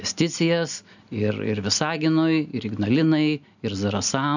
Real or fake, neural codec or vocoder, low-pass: real; none; 7.2 kHz